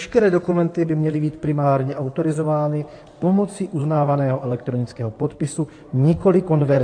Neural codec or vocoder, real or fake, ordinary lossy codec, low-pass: codec, 16 kHz in and 24 kHz out, 2.2 kbps, FireRedTTS-2 codec; fake; AAC, 48 kbps; 9.9 kHz